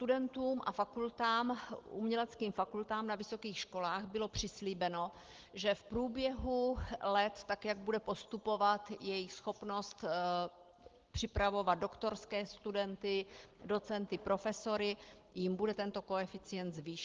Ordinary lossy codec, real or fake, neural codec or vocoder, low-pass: Opus, 16 kbps; real; none; 7.2 kHz